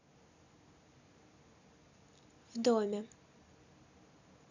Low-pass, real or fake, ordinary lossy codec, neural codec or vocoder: 7.2 kHz; real; none; none